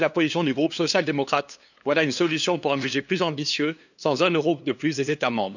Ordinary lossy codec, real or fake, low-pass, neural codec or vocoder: none; fake; 7.2 kHz; codec, 16 kHz, 2 kbps, FunCodec, trained on LibriTTS, 25 frames a second